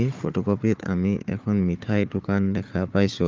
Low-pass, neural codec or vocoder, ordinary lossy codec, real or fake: 7.2 kHz; codec, 16 kHz, 4 kbps, FunCodec, trained on Chinese and English, 50 frames a second; Opus, 24 kbps; fake